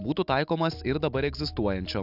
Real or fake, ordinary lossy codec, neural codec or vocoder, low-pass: real; AAC, 48 kbps; none; 5.4 kHz